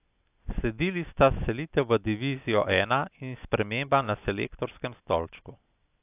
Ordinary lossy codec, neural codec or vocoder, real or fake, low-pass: AAC, 32 kbps; none; real; 3.6 kHz